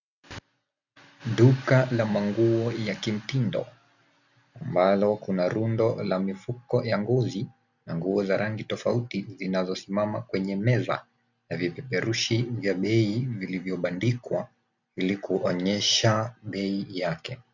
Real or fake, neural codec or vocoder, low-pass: real; none; 7.2 kHz